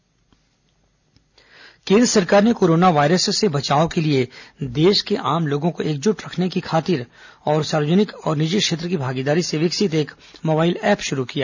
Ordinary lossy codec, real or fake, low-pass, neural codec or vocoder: none; real; 7.2 kHz; none